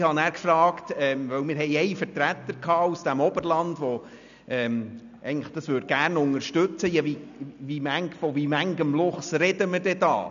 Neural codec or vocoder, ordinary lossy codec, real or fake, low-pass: none; none; real; 7.2 kHz